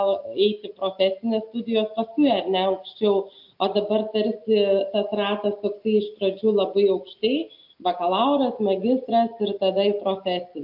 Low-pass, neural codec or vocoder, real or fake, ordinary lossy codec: 5.4 kHz; none; real; AAC, 48 kbps